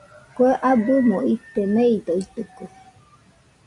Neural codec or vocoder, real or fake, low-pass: none; real; 10.8 kHz